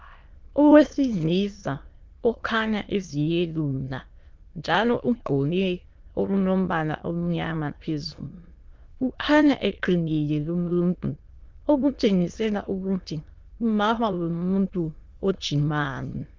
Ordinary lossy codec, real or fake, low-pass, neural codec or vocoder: Opus, 32 kbps; fake; 7.2 kHz; autoencoder, 22.05 kHz, a latent of 192 numbers a frame, VITS, trained on many speakers